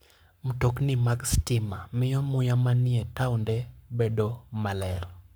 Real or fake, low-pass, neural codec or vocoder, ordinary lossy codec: fake; none; codec, 44.1 kHz, 7.8 kbps, Pupu-Codec; none